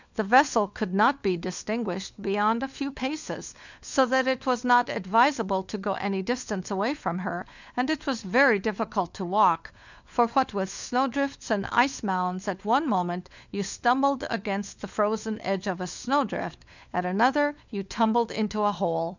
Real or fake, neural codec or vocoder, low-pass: fake; codec, 16 kHz, 4 kbps, FunCodec, trained on LibriTTS, 50 frames a second; 7.2 kHz